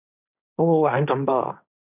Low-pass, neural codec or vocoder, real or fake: 3.6 kHz; codec, 16 kHz, 1.1 kbps, Voila-Tokenizer; fake